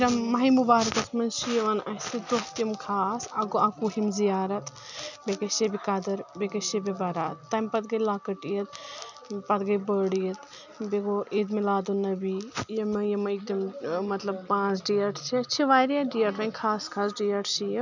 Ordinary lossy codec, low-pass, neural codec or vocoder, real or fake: none; 7.2 kHz; none; real